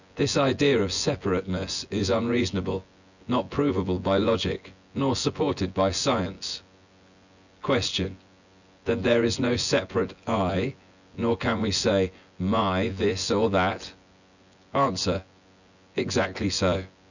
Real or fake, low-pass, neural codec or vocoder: fake; 7.2 kHz; vocoder, 24 kHz, 100 mel bands, Vocos